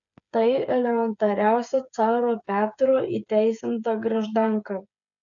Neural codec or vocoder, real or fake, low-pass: codec, 16 kHz, 8 kbps, FreqCodec, smaller model; fake; 7.2 kHz